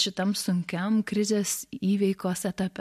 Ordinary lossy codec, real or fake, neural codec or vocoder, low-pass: MP3, 64 kbps; real; none; 14.4 kHz